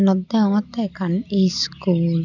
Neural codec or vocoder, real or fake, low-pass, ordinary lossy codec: none; real; 7.2 kHz; none